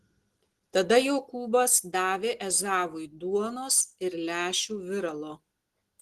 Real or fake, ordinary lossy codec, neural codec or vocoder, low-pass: real; Opus, 16 kbps; none; 14.4 kHz